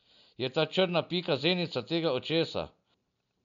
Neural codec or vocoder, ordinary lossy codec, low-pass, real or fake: none; MP3, 96 kbps; 7.2 kHz; real